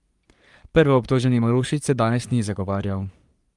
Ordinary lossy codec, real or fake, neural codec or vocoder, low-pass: Opus, 32 kbps; fake; codec, 44.1 kHz, 3.4 kbps, Pupu-Codec; 10.8 kHz